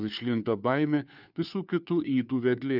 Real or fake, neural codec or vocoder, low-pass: fake; codec, 16 kHz, 2 kbps, FunCodec, trained on Chinese and English, 25 frames a second; 5.4 kHz